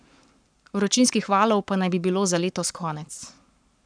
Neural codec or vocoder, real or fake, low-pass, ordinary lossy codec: codec, 44.1 kHz, 7.8 kbps, Pupu-Codec; fake; 9.9 kHz; none